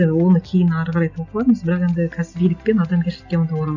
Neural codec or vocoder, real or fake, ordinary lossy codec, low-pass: none; real; none; 7.2 kHz